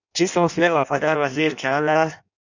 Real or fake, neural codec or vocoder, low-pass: fake; codec, 16 kHz in and 24 kHz out, 0.6 kbps, FireRedTTS-2 codec; 7.2 kHz